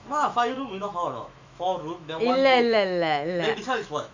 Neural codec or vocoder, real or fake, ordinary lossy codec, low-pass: autoencoder, 48 kHz, 128 numbers a frame, DAC-VAE, trained on Japanese speech; fake; MP3, 64 kbps; 7.2 kHz